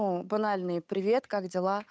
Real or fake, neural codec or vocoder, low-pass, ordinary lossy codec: fake; codec, 16 kHz, 8 kbps, FunCodec, trained on Chinese and English, 25 frames a second; none; none